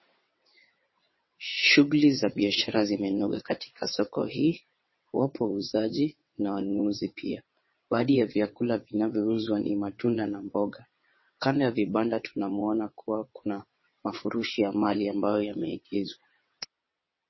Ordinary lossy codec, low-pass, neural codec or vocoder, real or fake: MP3, 24 kbps; 7.2 kHz; vocoder, 22.05 kHz, 80 mel bands, WaveNeXt; fake